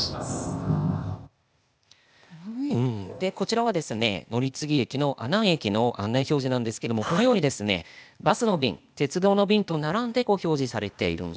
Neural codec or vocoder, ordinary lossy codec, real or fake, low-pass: codec, 16 kHz, 0.8 kbps, ZipCodec; none; fake; none